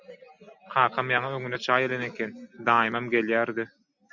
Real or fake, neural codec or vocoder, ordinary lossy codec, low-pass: real; none; MP3, 64 kbps; 7.2 kHz